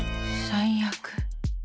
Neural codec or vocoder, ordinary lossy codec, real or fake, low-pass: none; none; real; none